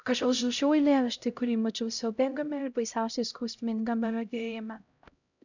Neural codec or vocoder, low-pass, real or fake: codec, 16 kHz, 0.5 kbps, X-Codec, HuBERT features, trained on LibriSpeech; 7.2 kHz; fake